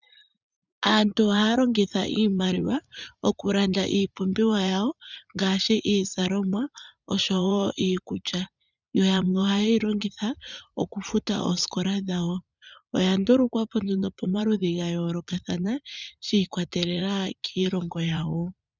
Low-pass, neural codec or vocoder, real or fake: 7.2 kHz; vocoder, 44.1 kHz, 80 mel bands, Vocos; fake